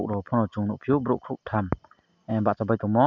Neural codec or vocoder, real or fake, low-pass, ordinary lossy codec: none; real; 7.2 kHz; none